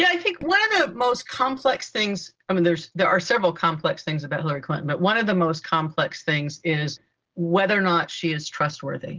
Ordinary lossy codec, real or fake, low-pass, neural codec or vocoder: Opus, 16 kbps; real; 7.2 kHz; none